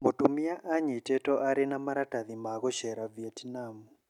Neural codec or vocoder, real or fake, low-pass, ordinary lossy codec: none; real; 19.8 kHz; none